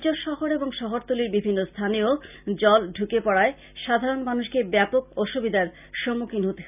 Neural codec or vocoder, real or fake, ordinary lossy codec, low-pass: none; real; none; 3.6 kHz